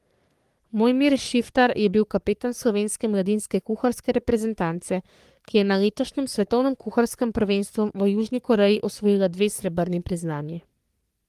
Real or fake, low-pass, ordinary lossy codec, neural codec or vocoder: fake; 14.4 kHz; Opus, 24 kbps; codec, 44.1 kHz, 3.4 kbps, Pupu-Codec